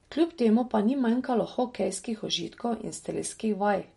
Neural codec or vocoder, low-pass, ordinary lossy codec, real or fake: none; 14.4 kHz; MP3, 48 kbps; real